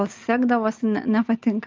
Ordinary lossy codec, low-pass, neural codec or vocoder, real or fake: Opus, 32 kbps; 7.2 kHz; none; real